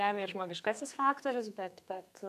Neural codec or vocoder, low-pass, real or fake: codec, 32 kHz, 1.9 kbps, SNAC; 14.4 kHz; fake